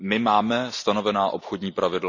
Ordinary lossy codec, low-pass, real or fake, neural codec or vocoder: none; 7.2 kHz; real; none